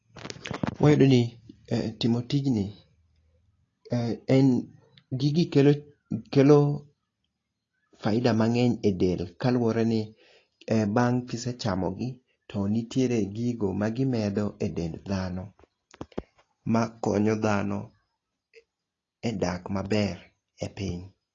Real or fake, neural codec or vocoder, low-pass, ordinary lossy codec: real; none; 7.2 kHz; AAC, 32 kbps